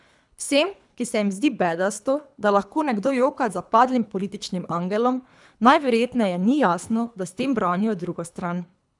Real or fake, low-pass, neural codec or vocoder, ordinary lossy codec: fake; 10.8 kHz; codec, 24 kHz, 3 kbps, HILCodec; none